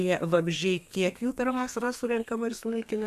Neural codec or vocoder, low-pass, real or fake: codec, 32 kHz, 1.9 kbps, SNAC; 14.4 kHz; fake